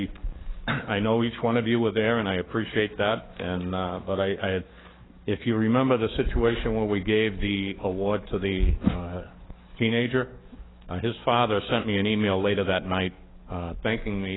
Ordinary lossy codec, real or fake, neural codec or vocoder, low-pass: AAC, 16 kbps; fake; codec, 44.1 kHz, 7.8 kbps, DAC; 7.2 kHz